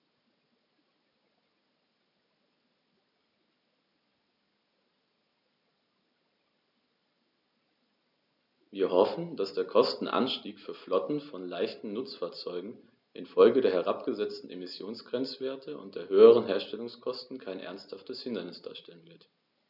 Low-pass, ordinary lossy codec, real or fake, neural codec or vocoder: 5.4 kHz; none; real; none